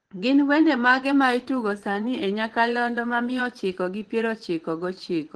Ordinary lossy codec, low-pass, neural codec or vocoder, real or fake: Opus, 16 kbps; 10.8 kHz; vocoder, 24 kHz, 100 mel bands, Vocos; fake